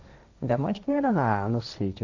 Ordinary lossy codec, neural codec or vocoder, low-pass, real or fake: none; codec, 16 kHz, 1.1 kbps, Voila-Tokenizer; 7.2 kHz; fake